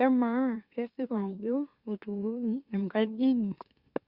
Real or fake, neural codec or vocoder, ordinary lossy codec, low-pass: fake; autoencoder, 44.1 kHz, a latent of 192 numbers a frame, MeloTTS; Opus, 64 kbps; 5.4 kHz